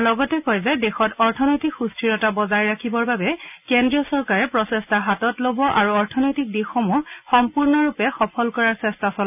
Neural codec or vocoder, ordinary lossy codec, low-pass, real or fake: none; none; 3.6 kHz; real